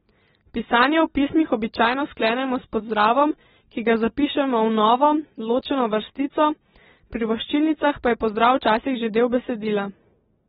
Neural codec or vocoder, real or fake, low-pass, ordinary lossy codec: none; real; 10.8 kHz; AAC, 16 kbps